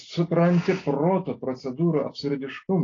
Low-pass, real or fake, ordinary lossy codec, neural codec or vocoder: 7.2 kHz; real; AAC, 32 kbps; none